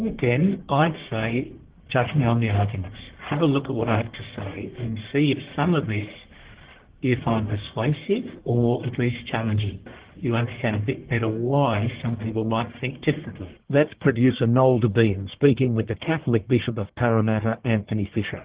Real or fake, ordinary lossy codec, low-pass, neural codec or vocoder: fake; Opus, 16 kbps; 3.6 kHz; codec, 44.1 kHz, 1.7 kbps, Pupu-Codec